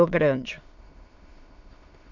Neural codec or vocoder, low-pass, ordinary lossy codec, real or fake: autoencoder, 22.05 kHz, a latent of 192 numbers a frame, VITS, trained on many speakers; 7.2 kHz; none; fake